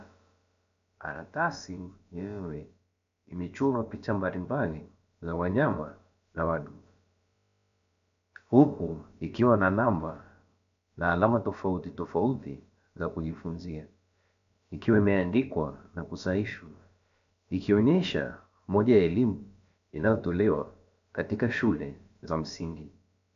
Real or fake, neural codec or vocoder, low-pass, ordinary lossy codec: fake; codec, 16 kHz, about 1 kbps, DyCAST, with the encoder's durations; 7.2 kHz; AAC, 64 kbps